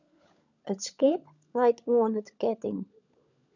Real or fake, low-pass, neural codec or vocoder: fake; 7.2 kHz; codec, 16 kHz, 16 kbps, FunCodec, trained on LibriTTS, 50 frames a second